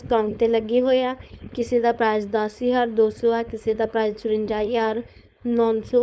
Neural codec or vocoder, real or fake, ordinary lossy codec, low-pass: codec, 16 kHz, 4.8 kbps, FACodec; fake; none; none